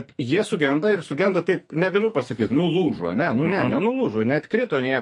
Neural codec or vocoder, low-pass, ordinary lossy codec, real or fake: codec, 44.1 kHz, 2.6 kbps, SNAC; 10.8 kHz; MP3, 48 kbps; fake